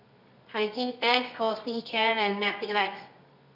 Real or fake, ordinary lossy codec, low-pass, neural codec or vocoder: fake; none; 5.4 kHz; codec, 24 kHz, 0.9 kbps, WavTokenizer, medium music audio release